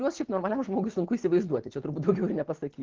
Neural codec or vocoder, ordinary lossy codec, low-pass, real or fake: none; Opus, 16 kbps; 7.2 kHz; real